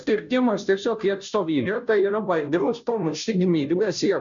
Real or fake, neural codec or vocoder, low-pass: fake; codec, 16 kHz, 0.5 kbps, FunCodec, trained on Chinese and English, 25 frames a second; 7.2 kHz